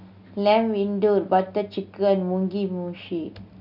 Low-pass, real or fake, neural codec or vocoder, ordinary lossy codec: 5.4 kHz; real; none; none